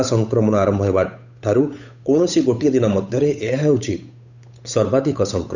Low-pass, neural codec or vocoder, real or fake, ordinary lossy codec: 7.2 kHz; codec, 16 kHz, 8 kbps, FunCodec, trained on Chinese and English, 25 frames a second; fake; none